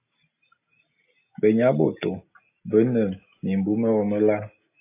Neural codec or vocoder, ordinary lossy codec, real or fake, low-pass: none; AAC, 24 kbps; real; 3.6 kHz